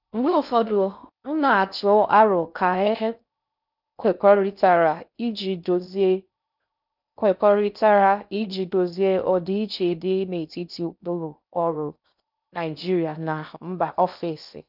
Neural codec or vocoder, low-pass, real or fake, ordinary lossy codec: codec, 16 kHz in and 24 kHz out, 0.6 kbps, FocalCodec, streaming, 4096 codes; 5.4 kHz; fake; none